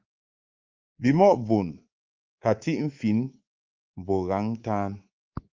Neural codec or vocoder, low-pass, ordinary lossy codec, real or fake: codec, 24 kHz, 3.1 kbps, DualCodec; 7.2 kHz; Opus, 24 kbps; fake